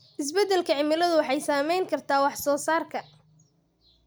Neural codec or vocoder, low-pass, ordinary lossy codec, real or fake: none; none; none; real